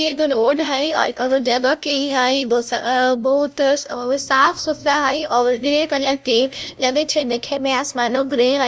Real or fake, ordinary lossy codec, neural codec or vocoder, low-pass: fake; none; codec, 16 kHz, 0.5 kbps, FunCodec, trained on LibriTTS, 25 frames a second; none